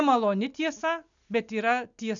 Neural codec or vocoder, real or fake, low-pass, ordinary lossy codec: none; real; 7.2 kHz; MP3, 96 kbps